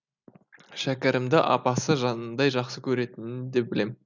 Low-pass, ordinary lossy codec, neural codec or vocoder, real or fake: 7.2 kHz; none; none; real